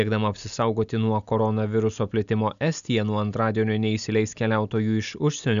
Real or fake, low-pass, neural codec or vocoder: real; 7.2 kHz; none